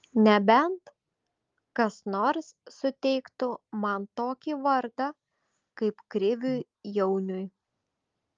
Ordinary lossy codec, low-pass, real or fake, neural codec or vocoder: Opus, 32 kbps; 7.2 kHz; real; none